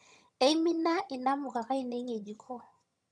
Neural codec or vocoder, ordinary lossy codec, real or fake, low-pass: vocoder, 22.05 kHz, 80 mel bands, HiFi-GAN; none; fake; none